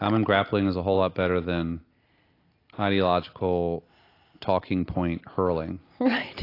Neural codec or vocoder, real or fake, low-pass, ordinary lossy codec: none; real; 5.4 kHz; AAC, 32 kbps